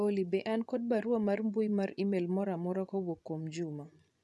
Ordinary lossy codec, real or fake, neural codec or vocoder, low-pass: none; fake; vocoder, 24 kHz, 100 mel bands, Vocos; none